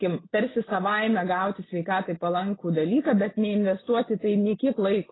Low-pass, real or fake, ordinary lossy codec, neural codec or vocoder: 7.2 kHz; real; AAC, 16 kbps; none